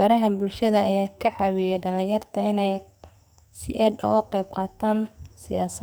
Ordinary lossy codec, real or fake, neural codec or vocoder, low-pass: none; fake; codec, 44.1 kHz, 2.6 kbps, SNAC; none